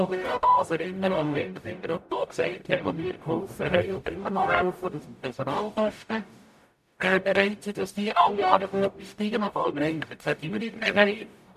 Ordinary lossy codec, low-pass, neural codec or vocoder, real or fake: MP3, 96 kbps; 14.4 kHz; codec, 44.1 kHz, 0.9 kbps, DAC; fake